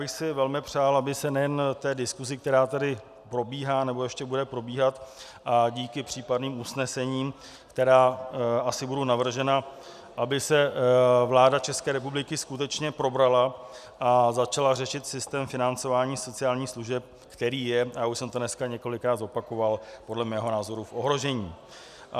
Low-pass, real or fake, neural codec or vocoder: 14.4 kHz; real; none